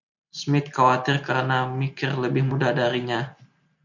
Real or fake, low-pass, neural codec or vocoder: real; 7.2 kHz; none